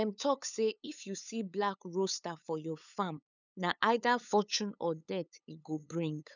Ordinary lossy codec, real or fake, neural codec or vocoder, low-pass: none; fake; codec, 16 kHz, 8 kbps, FunCodec, trained on LibriTTS, 25 frames a second; 7.2 kHz